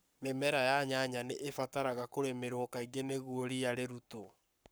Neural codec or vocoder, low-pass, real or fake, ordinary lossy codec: codec, 44.1 kHz, 7.8 kbps, Pupu-Codec; none; fake; none